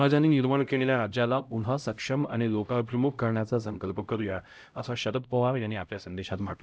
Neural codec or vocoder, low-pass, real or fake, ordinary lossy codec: codec, 16 kHz, 0.5 kbps, X-Codec, HuBERT features, trained on LibriSpeech; none; fake; none